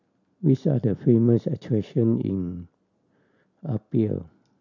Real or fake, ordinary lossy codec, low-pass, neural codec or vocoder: real; none; 7.2 kHz; none